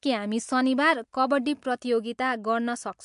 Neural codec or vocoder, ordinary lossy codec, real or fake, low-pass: none; MP3, 64 kbps; real; 10.8 kHz